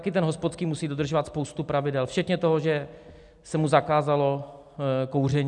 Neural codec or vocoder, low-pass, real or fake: none; 10.8 kHz; real